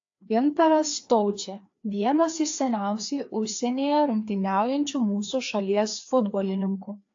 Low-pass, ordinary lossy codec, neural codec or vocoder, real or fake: 7.2 kHz; AAC, 48 kbps; codec, 16 kHz, 2 kbps, FreqCodec, larger model; fake